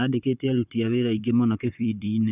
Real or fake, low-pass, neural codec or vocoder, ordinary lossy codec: fake; 3.6 kHz; vocoder, 44.1 kHz, 128 mel bands, Pupu-Vocoder; none